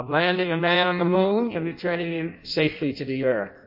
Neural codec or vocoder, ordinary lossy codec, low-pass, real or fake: codec, 16 kHz in and 24 kHz out, 0.6 kbps, FireRedTTS-2 codec; MP3, 32 kbps; 5.4 kHz; fake